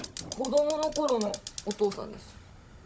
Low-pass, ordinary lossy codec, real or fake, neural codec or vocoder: none; none; fake; codec, 16 kHz, 16 kbps, FunCodec, trained on Chinese and English, 50 frames a second